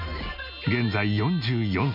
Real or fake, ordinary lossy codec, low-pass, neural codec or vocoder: real; none; 5.4 kHz; none